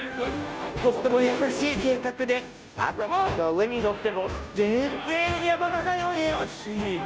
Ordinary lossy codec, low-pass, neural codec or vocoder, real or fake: none; none; codec, 16 kHz, 0.5 kbps, FunCodec, trained on Chinese and English, 25 frames a second; fake